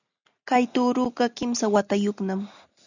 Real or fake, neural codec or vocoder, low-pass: real; none; 7.2 kHz